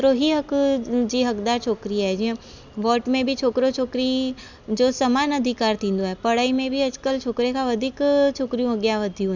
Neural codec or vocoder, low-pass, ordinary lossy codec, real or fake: none; 7.2 kHz; Opus, 64 kbps; real